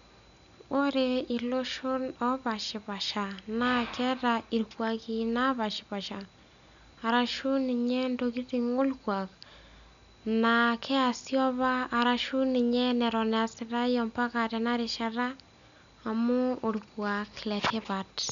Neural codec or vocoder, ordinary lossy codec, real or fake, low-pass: none; none; real; 7.2 kHz